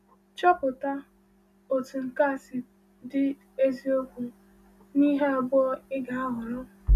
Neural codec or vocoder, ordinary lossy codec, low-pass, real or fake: none; none; 14.4 kHz; real